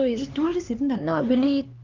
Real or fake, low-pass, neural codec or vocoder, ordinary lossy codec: fake; 7.2 kHz; codec, 16 kHz, 4 kbps, X-Codec, HuBERT features, trained on LibriSpeech; Opus, 24 kbps